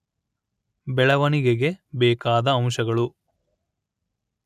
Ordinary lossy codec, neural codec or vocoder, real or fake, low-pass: none; none; real; 14.4 kHz